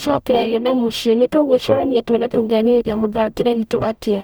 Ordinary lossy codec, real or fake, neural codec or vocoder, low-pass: none; fake; codec, 44.1 kHz, 0.9 kbps, DAC; none